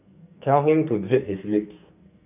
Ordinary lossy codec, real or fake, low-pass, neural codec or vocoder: none; fake; 3.6 kHz; codec, 44.1 kHz, 2.6 kbps, SNAC